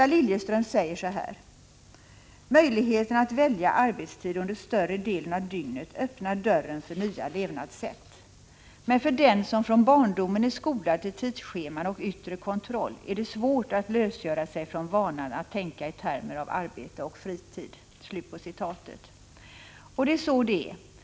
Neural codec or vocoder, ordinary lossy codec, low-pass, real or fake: none; none; none; real